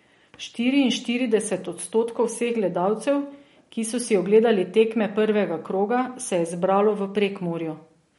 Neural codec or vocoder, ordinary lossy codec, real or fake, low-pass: none; MP3, 48 kbps; real; 19.8 kHz